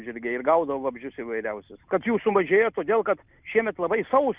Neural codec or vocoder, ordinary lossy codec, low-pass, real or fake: none; Opus, 64 kbps; 3.6 kHz; real